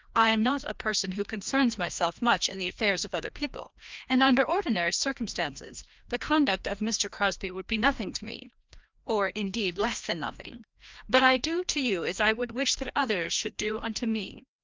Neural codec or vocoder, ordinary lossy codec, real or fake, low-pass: codec, 16 kHz, 1 kbps, FreqCodec, larger model; Opus, 24 kbps; fake; 7.2 kHz